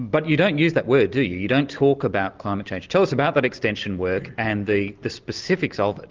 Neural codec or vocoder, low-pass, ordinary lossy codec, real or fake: none; 7.2 kHz; Opus, 32 kbps; real